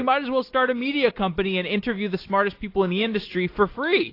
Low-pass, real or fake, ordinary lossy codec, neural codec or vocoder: 5.4 kHz; real; AAC, 32 kbps; none